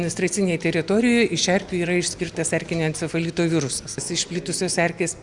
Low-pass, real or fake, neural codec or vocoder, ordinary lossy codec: 10.8 kHz; real; none; Opus, 64 kbps